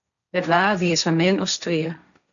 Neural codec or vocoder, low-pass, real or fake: codec, 16 kHz, 1.1 kbps, Voila-Tokenizer; 7.2 kHz; fake